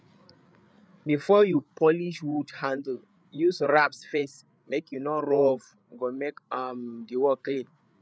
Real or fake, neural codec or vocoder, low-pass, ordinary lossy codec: fake; codec, 16 kHz, 8 kbps, FreqCodec, larger model; none; none